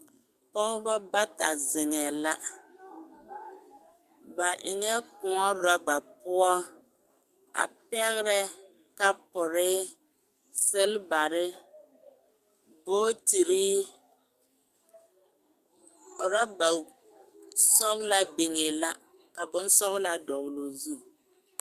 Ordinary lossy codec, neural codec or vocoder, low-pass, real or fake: Opus, 64 kbps; codec, 44.1 kHz, 2.6 kbps, SNAC; 14.4 kHz; fake